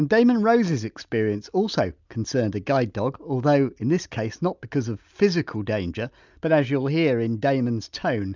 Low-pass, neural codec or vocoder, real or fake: 7.2 kHz; none; real